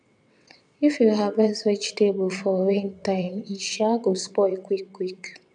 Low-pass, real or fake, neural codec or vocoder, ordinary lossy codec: 9.9 kHz; fake; vocoder, 22.05 kHz, 80 mel bands, WaveNeXt; none